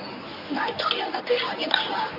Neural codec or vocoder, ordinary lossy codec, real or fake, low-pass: codec, 24 kHz, 0.9 kbps, WavTokenizer, medium speech release version 1; none; fake; 5.4 kHz